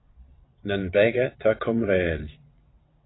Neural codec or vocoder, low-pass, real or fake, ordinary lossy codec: vocoder, 22.05 kHz, 80 mel bands, WaveNeXt; 7.2 kHz; fake; AAC, 16 kbps